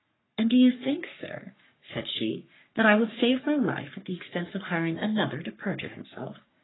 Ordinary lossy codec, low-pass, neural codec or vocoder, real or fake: AAC, 16 kbps; 7.2 kHz; codec, 44.1 kHz, 3.4 kbps, Pupu-Codec; fake